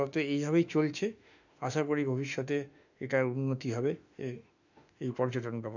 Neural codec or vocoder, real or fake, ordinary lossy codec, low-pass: autoencoder, 48 kHz, 32 numbers a frame, DAC-VAE, trained on Japanese speech; fake; none; 7.2 kHz